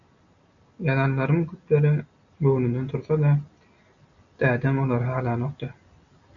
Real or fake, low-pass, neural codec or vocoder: real; 7.2 kHz; none